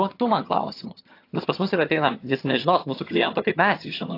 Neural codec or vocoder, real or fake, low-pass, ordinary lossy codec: vocoder, 22.05 kHz, 80 mel bands, HiFi-GAN; fake; 5.4 kHz; AAC, 32 kbps